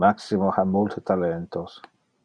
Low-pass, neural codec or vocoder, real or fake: 9.9 kHz; none; real